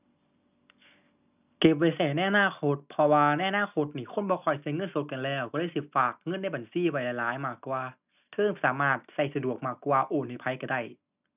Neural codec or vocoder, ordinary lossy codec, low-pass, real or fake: none; none; 3.6 kHz; real